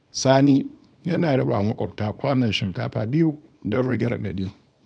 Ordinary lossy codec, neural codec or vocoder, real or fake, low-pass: none; codec, 24 kHz, 0.9 kbps, WavTokenizer, small release; fake; 9.9 kHz